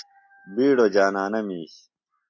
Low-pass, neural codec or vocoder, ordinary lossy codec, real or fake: 7.2 kHz; none; MP3, 48 kbps; real